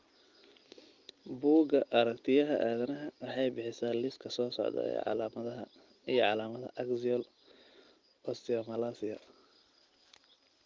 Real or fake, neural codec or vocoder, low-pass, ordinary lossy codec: real; none; 7.2 kHz; Opus, 32 kbps